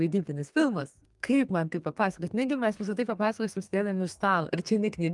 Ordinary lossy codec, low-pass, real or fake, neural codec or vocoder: Opus, 32 kbps; 10.8 kHz; fake; codec, 32 kHz, 1.9 kbps, SNAC